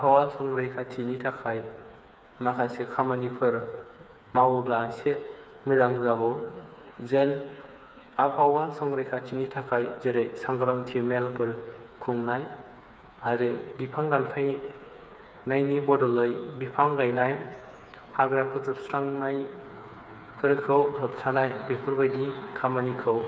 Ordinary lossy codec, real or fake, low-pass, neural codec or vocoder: none; fake; none; codec, 16 kHz, 4 kbps, FreqCodec, smaller model